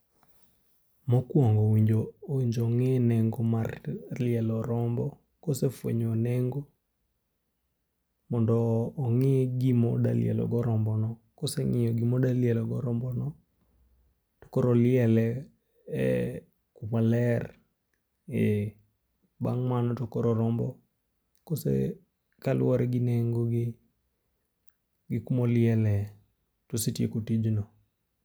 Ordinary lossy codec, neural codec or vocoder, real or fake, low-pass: none; none; real; none